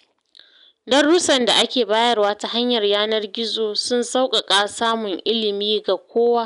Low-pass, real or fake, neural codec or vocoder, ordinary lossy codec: 10.8 kHz; real; none; none